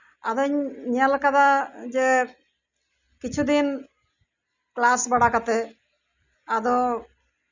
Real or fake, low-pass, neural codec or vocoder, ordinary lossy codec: real; 7.2 kHz; none; none